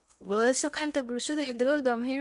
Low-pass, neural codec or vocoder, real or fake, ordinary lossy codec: 10.8 kHz; codec, 16 kHz in and 24 kHz out, 0.8 kbps, FocalCodec, streaming, 65536 codes; fake; none